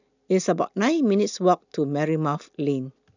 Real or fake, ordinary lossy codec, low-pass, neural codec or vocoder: real; none; 7.2 kHz; none